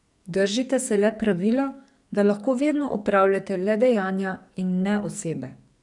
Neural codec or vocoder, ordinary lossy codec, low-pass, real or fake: codec, 44.1 kHz, 2.6 kbps, SNAC; none; 10.8 kHz; fake